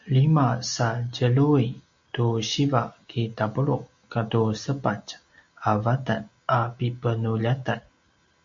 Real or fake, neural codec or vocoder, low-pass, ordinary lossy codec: real; none; 7.2 kHz; MP3, 48 kbps